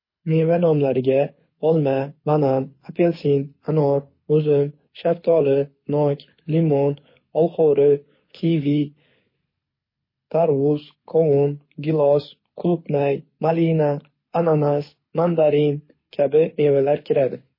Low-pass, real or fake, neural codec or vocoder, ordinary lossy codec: 5.4 kHz; fake; codec, 24 kHz, 6 kbps, HILCodec; MP3, 24 kbps